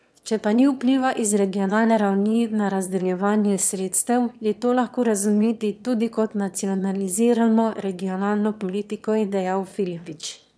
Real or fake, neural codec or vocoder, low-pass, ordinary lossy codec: fake; autoencoder, 22.05 kHz, a latent of 192 numbers a frame, VITS, trained on one speaker; none; none